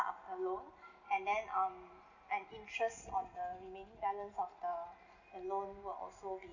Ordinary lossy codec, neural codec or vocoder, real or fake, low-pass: none; none; real; 7.2 kHz